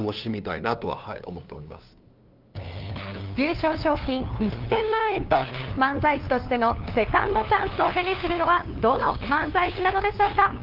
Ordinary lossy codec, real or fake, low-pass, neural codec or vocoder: Opus, 32 kbps; fake; 5.4 kHz; codec, 16 kHz, 2 kbps, FunCodec, trained on LibriTTS, 25 frames a second